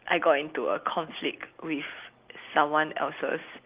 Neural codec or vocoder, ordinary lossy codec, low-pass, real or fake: none; Opus, 32 kbps; 3.6 kHz; real